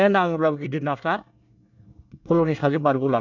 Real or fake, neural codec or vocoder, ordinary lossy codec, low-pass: fake; codec, 24 kHz, 1 kbps, SNAC; none; 7.2 kHz